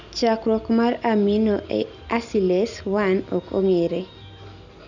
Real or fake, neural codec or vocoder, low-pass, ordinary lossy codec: real; none; 7.2 kHz; none